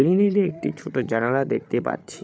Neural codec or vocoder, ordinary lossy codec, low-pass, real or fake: codec, 16 kHz, 8 kbps, FreqCodec, larger model; none; none; fake